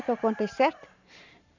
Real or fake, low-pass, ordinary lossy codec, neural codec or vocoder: fake; 7.2 kHz; none; vocoder, 44.1 kHz, 128 mel bands every 512 samples, BigVGAN v2